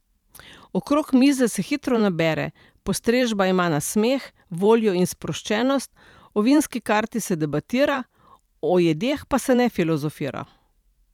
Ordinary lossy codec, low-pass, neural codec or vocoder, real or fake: none; 19.8 kHz; vocoder, 44.1 kHz, 128 mel bands every 512 samples, BigVGAN v2; fake